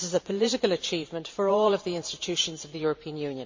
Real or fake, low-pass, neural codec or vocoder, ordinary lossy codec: fake; 7.2 kHz; vocoder, 44.1 kHz, 128 mel bands every 512 samples, BigVGAN v2; MP3, 64 kbps